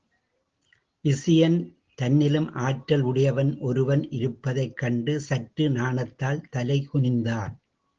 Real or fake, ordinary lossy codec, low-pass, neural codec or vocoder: real; Opus, 16 kbps; 7.2 kHz; none